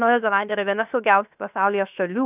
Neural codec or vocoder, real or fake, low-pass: codec, 16 kHz, 0.7 kbps, FocalCodec; fake; 3.6 kHz